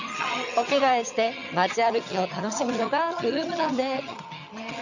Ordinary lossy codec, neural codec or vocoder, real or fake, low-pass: none; vocoder, 22.05 kHz, 80 mel bands, HiFi-GAN; fake; 7.2 kHz